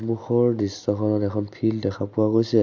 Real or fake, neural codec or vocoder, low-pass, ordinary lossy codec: real; none; 7.2 kHz; none